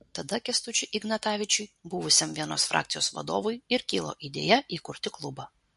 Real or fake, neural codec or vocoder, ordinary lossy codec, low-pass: real; none; MP3, 48 kbps; 14.4 kHz